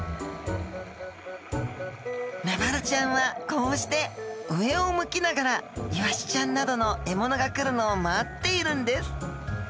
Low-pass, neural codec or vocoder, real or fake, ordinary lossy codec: none; none; real; none